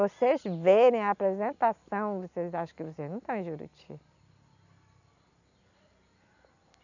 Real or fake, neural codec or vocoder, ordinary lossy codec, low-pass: real; none; none; 7.2 kHz